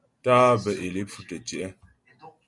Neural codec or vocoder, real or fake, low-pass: none; real; 10.8 kHz